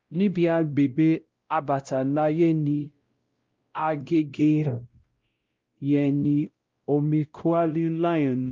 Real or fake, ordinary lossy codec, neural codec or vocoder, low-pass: fake; Opus, 24 kbps; codec, 16 kHz, 0.5 kbps, X-Codec, WavLM features, trained on Multilingual LibriSpeech; 7.2 kHz